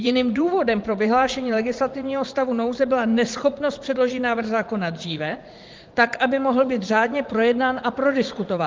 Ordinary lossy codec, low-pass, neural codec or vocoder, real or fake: Opus, 32 kbps; 7.2 kHz; vocoder, 44.1 kHz, 80 mel bands, Vocos; fake